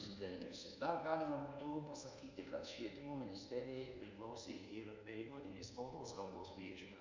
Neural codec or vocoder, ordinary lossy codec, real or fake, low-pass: codec, 24 kHz, 1.2 kbps, DualCodec; MP3, 48 kbps; fake; 7.2 kHz